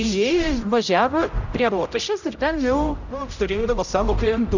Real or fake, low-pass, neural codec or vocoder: fake; 7.2 kHz; codec, 16 kHz, 0.5 kbps, X-Codec, HuBERT features, trained on general audio